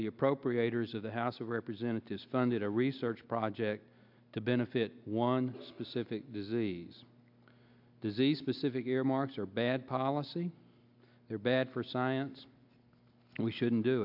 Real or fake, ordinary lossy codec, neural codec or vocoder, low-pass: real; AAC, 48 kbps; none; 5.4 kHz